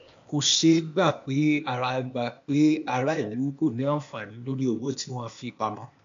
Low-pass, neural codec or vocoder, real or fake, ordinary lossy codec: 7.2 kHz; codec, 16 kHz, 0.8 kbps, ZipCodec; fake; AAC, 64 kbps